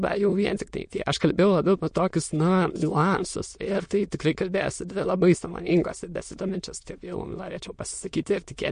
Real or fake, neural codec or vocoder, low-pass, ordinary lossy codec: fake; autoencoder, 22.05 kHz, a latent of 192 numbers a frame, VITS, trained on many speakers; 9.9 kHz; MP3, 48 kbps